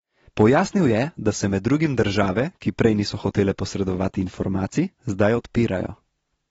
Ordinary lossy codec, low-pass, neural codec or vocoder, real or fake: AAC, 24 kbps; 10.8 kHz; none; real